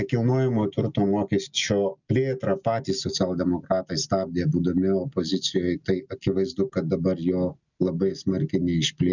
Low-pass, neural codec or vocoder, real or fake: 7.2 kHz; none; real